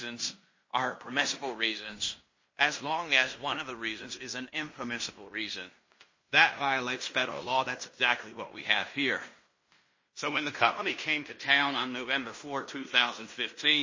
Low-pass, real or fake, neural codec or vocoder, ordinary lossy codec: 7.2 kHz; fake; codec, 16 kHz in and 24 kHz out, 0.9 kbps, LongCat-Audio-Codec, fine tuned four codebook decoder; MP3, 32 kbps